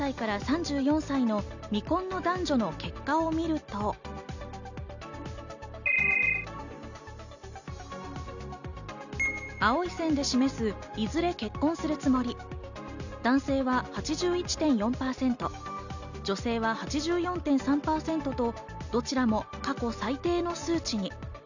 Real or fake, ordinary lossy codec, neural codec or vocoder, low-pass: real; none; none; 7.2 kHz